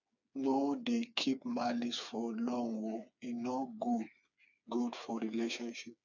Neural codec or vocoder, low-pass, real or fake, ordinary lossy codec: codec, 16 kHz, 4 kbps, FreqCodec, smaller model; 7.2 kHz; fake; none